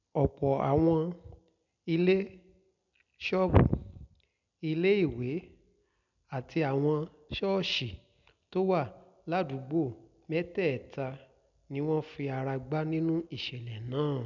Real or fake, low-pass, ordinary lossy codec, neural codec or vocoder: real; 7.2 kHz; none; none